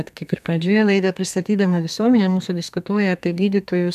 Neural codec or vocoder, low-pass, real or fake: codec, 32 kHz, 1.9 kbps, SNAC; 14.4 kHz; fake